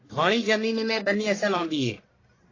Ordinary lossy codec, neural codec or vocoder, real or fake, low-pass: AAC, 32 kbps; codec, 44.1 kHz, 1.7 kbps, Pupu-Codec; fake; 7.2 kHz